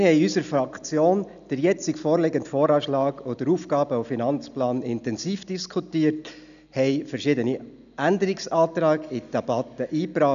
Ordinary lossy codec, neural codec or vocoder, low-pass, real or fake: none; none; 7.2 kHz; real